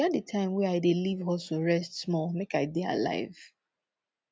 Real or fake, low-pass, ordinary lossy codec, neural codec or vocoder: real; none; none; none